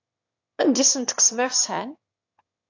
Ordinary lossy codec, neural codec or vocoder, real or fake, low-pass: AAC, 48 kbps; autoencoder, 22.05 kHz, a latent of 192 numbers a frame, VITS, trained on one speaker; fake; 7.2 kHz